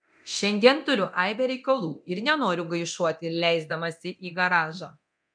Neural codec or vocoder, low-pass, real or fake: codec, 24 kHz, 0.9 kbps, DualCodec; 9.9 kHz; fake